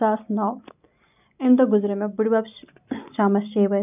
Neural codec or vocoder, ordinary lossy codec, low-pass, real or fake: none; none; 3.6 kHz; real